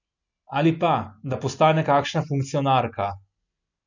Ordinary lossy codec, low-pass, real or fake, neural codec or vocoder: none; 7.2 kHz; real; none